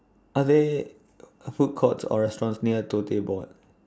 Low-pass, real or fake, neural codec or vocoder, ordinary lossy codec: none; real; none; none